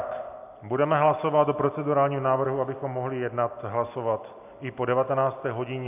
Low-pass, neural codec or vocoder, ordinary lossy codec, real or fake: 3.6 kHz; none; AAC, 32 kbps; real